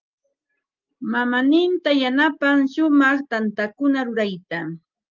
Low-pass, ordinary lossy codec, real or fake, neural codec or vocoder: 7.2 kHz; Opus, 24 kbps; real; none